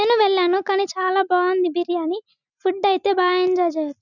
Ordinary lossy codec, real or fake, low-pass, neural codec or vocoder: none; real; 7.2 kHz; none